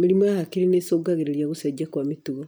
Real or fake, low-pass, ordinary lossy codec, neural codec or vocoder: real; none; none; none